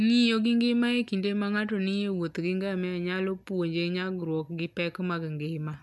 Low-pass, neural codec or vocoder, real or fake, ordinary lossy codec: none; none; real; none